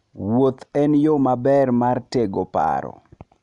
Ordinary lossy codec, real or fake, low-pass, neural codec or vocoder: none; real; 10.8 kHz; none